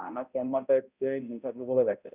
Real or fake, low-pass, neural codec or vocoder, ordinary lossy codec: fake; 3.6 kHz; codec, 16 kHz, 0.5 kbps, X-Codec, HuBERT features, trained on balanced general audio; Opus, 64 kbps